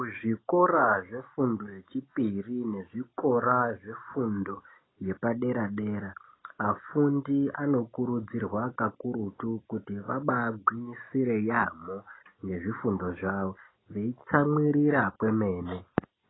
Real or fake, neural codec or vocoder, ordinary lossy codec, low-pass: real; none; AAC, 16 kbps; 7.2 kHz